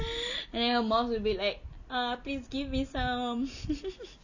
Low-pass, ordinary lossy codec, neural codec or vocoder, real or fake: 7.2 kHz; none; none; real